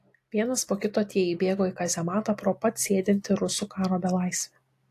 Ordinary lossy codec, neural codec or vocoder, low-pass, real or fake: AAC, 64 kbps; none; 14.4 kHz; real